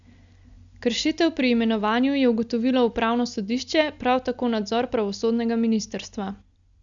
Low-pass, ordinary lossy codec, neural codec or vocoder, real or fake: 7.2 kHz; Opus, 64 kbps; none; real